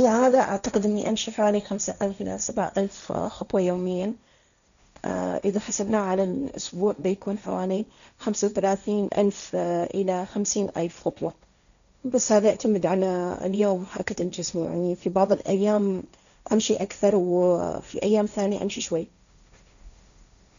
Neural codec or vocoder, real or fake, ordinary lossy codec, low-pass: codec, 16 kHz, 1.1 kbps, Voila-Tokenizer; fake; none; 7.2 kHz